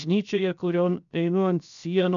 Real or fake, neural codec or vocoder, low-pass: fake; codec, 16 kHz, 0.7 kbps, FocalCodec; 7.2 kHz